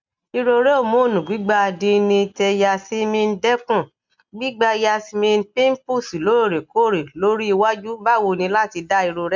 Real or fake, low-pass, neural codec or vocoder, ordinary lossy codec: real; 7.2 kHz; none; MP3, 64 kbps